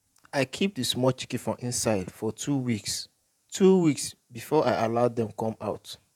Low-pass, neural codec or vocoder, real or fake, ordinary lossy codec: 19.8 kHz; vocoder, 48 kHz, 128 mel bands, Vocos; fake; none